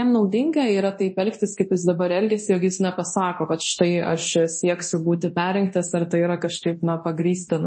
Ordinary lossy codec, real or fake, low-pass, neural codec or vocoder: MP3, 32 kbps; fake; 9.9 kHz; codec, 24 kHz, 0.9 kbps, DualCodec